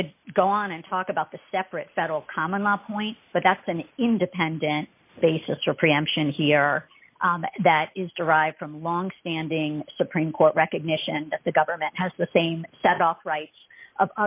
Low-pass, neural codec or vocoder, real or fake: 3.6 kHz; none; real